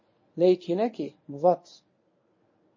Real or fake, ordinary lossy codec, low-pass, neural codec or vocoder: fake; MP3, 32 kbps; 7.2 kHz; codec, 24 kHz, 0.9 kbps, WavTokenizer, medium speech release version 1